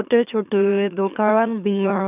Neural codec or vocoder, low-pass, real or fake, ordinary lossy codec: autoencoder, 44.1 kHz, a latent of 192 numbers a frame, MeloTTS; 3.6 kHz; fake; none